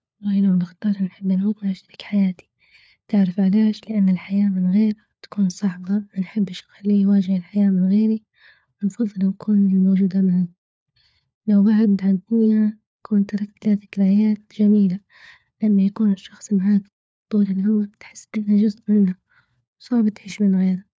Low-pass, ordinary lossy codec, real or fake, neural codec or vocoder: none; none; fake; codec, 16 kHz, 4 kbps, FunCodec, trained on LibriTTS, 50 frames a second